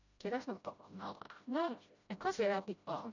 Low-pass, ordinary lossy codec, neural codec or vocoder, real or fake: 7.2 kHz; none; codec, 16 kHz, 0.5 kbps, FreqCodec, smaller model; fake